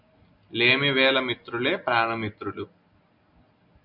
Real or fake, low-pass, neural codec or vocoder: real; 5.4 kHz; none